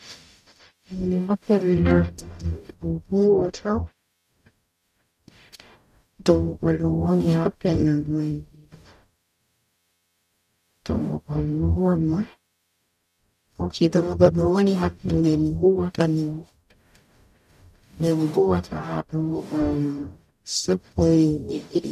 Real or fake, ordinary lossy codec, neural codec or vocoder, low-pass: fake; none; codec, 44.1 kHz, 0.9 kbps, DAC; 14.4 kHz